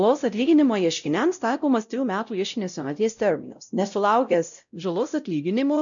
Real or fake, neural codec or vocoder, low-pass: fake; codec, 16 kHz, 0.5 kbps, X-Codec, WavLM features, trained on Multilingual LibriSpeech; 7.2 kHz